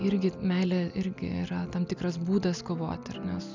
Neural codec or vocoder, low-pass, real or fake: none; 7.2 kHz; real